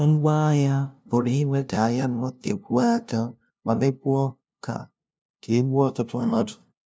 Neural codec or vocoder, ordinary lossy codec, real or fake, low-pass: codec, 16 kHz, 0.5 kbps, FunCodec, trained on LibriTTS, 25 frames a second; none; fake; none